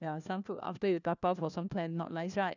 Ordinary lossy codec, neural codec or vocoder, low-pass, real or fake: none; codec, 16 kHz, 1 kbps, FunCodec, trained on LibriTTS, 50 frames a second; 7.2 kHz; fake